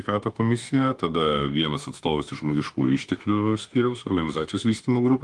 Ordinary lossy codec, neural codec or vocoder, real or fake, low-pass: Opus, 24 kbps; autoencoder, 48 kHz, 32 numbers a frame, DAC-VAE, trained on Japanese speech; fake; 10.8 kHz